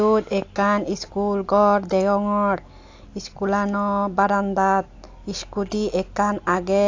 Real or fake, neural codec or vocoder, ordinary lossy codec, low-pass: real; none; AAC, 48 kbps; 7.2 kHz